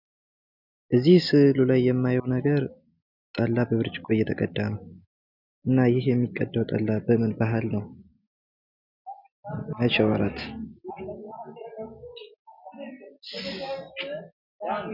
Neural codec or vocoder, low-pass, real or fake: none; 5.4 kHz; real